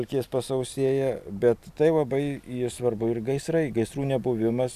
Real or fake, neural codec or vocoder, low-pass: real; none; 14.4 kHz